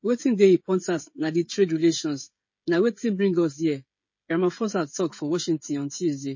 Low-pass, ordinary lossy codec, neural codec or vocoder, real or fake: 7.2 kHz; MP3, 32 kbps; codec, 16 kHz, 16 kbps, FreqCodec, smaller model; fake